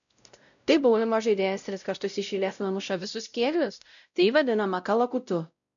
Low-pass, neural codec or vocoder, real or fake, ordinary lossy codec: 7.2 kHz; codec, 16 kHz, 0.5 kbps, X-Codec, WavLM features, trained on Multilingual LibriSpeech; fake; MP3, 96 kbps